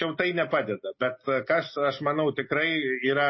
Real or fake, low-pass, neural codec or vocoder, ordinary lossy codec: real; 7.2 kHz; none; MP3, 24 kbps